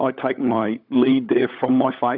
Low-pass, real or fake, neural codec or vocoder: 5.4 kHz; fake; codec, 16 kHz, 16 kbps, FunCodec, trained on LibriTTS, 50 frames a second